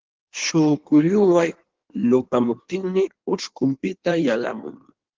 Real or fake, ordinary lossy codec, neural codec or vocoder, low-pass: fake; Opus, 16 kbps; codec, 16 kHz in and 24 kHz out, 1.1 kbps, FireRedTTS-2 codec; 7.2 kHz